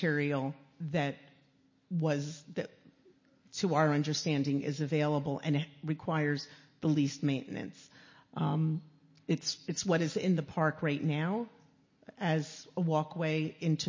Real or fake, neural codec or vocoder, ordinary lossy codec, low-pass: real; none; MP3, 32 kbps; 7.2 kHz